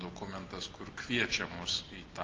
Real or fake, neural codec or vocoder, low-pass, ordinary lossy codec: real; none; 7.2 kHz; Opus, 32 kbps